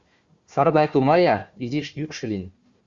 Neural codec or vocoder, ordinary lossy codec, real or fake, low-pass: codec, 16 kHz, 1 kbps, FunCodec, trained on Chinese and English, 50 frames a second; Opus, 64 kbps; fake; 7.2 kHz